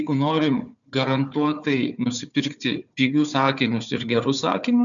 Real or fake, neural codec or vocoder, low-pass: fake; codec, 16 kHz, 4 kbps, FunCodec, trained on Chinese and English, 50 frames a second; 7.2 kHz